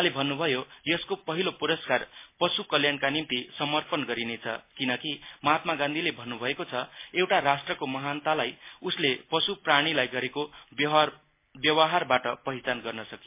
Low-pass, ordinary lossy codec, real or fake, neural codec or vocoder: 3.6 kHz; MP3, 24 kbps; real; none